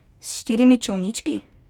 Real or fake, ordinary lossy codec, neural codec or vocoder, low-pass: fake; none; codec, 44.1 kHz, 2.6 kbps, DAC; 19.8 kHz